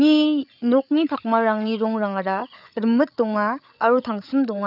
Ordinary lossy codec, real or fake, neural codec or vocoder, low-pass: none; fake; codec, 16 kHz, 8 kbps, FreqCodec, larger model; 5.4 kHz